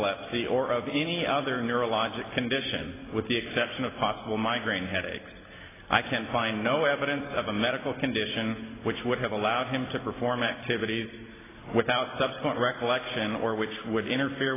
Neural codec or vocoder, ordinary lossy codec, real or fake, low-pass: none; AAC, 16 kbps; real; 3.6 kHz